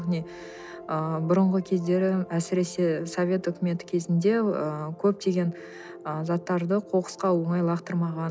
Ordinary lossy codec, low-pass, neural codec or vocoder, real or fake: none; none; none; real